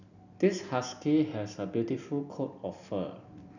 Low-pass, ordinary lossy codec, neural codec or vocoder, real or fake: 7.2 kHz; none; none; real